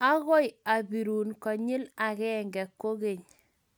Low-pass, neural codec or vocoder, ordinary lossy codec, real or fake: none; none; none; real